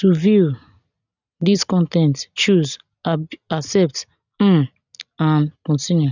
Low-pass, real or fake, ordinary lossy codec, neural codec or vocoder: 7.2 kHz; real; none; none